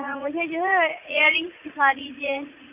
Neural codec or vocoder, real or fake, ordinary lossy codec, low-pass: vocoder, 44.1 kHz, 80 mel bands, Vocos; fake; none; 3.6 kHz